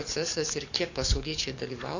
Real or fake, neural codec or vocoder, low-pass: fake; codec, 44.1 kHz, 7.8 kbps, DAC; 7.2 kHz